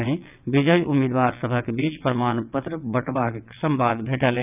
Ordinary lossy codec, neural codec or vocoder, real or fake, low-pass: none; vocoder, 22.05 kHz, 80 mel bands, WaveNeXt; fake; 3.6 kHz